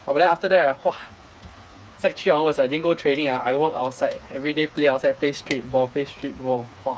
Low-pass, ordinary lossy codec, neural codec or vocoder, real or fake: none; none; codec, 16 kHz, 4 kbps, FreqCodec, smaller model; fake